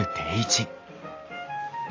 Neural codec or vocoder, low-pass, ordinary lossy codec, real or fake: none; 7.2 kHz; AAC, 32 kbps; real